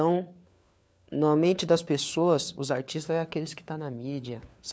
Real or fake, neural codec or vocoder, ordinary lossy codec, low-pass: fake; codec, 16 kHz, 4 kbps, FunCodec, trained on LibriTTS, 50 frames a second; none; none